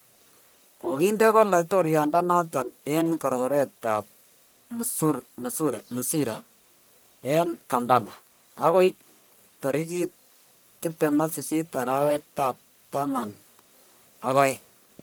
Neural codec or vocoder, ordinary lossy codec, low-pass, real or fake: codec, 44.1 kHz, 1.7 kbps, Pupu-Codec; none; none; fake